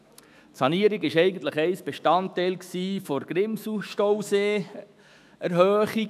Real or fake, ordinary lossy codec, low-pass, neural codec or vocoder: fake; none; 14.4 kHz; autoencoder, 48 kHz, 128 numbers a frame, DAC-VAE, trained on Japanese speech